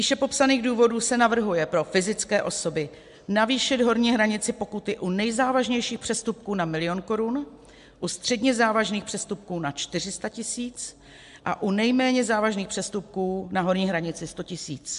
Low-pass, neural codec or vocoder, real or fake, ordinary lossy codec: 10.8 kHz; none; real; MP3, 64 kbps